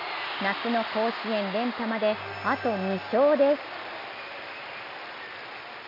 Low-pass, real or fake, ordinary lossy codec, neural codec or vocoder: 5.4 kHz; real; AAC, 48 kbps; none